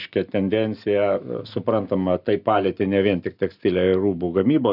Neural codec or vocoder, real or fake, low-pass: none; real; 5.4 kHz